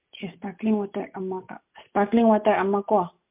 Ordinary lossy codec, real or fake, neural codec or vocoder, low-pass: MP3, 32 kbps; real; none; 3.6 kHz